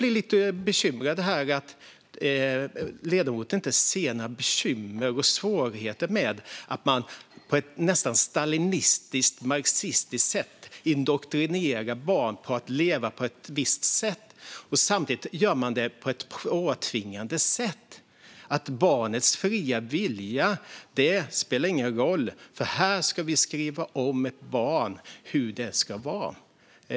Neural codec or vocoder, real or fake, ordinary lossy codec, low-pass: none; real; none; none